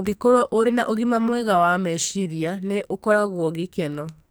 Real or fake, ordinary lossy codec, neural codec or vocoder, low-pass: fake; none; codec, 44.1 kHz, 2.6 kbps, SNAC; none